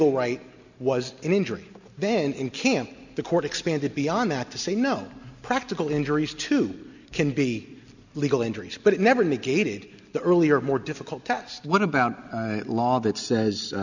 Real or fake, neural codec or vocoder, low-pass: real; none; 7.2 kHz